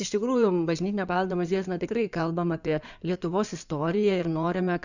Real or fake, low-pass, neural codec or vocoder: fake; 7.2 kHz; codec, 16 kHz in and 24 kHz out, 2.2 kbps, FireRedTTS-2 codec